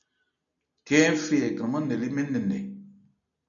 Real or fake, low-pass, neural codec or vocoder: real; 7.2 kHz; none